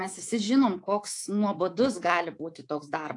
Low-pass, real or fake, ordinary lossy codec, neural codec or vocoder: 10.8 kHz; fake; AAC, 48 kbps; codec, 24 kHz, 3.1 kbps, DualCodec